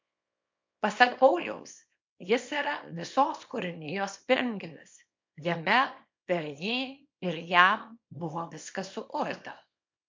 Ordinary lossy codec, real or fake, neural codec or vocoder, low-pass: MP3, 48 kbps; fake; codec, 24 kHz, 0.9 kbps, WavTokenizer, small release; 7.2 kHz